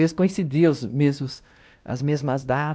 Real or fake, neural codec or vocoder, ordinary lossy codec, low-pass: fake; codec, 16 kHz, 1 kbps, X-Codec, WavLM features, trained on Multilingual LibriSpeech; none; none